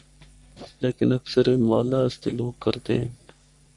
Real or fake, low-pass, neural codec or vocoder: fake; 10.8 kHz; codec, 44.1 kHz, 3.4 kbps, Pupu-Codec